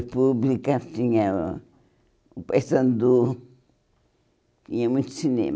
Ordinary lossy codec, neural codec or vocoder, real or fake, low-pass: none; none; real; none